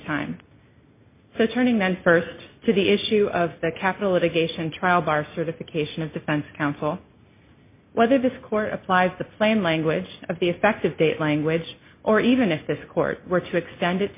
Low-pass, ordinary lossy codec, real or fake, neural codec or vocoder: 3.6 kHz; MP3, 24 kbps; real; none